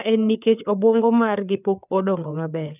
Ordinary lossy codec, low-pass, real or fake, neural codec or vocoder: none; 3.6 kHz; fake; codec, 16 kHz, 4 kbps, FreqCodec, larger model